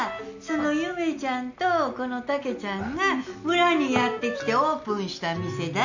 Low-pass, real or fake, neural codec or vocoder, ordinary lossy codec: 7.2 kHz; real; none; none